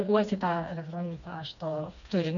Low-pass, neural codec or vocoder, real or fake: 7.2 kHz; codec, 16 kHz, 2 kbps, FreqCodec, smaller model; fake